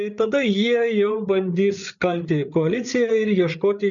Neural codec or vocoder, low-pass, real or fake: codec, 16 kHz, 8 kbps, FreqCodec, larger model; 7.2 kHz; fake